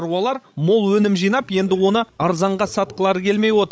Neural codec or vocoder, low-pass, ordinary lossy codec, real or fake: codec, 16 kHz, 16 kbps, FreqCodec, larger model; none; none; fake